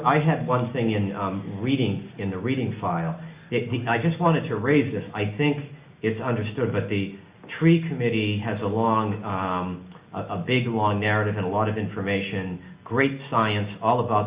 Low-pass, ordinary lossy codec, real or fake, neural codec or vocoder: 3.6 kHz; Opus, 64 kbps; real; none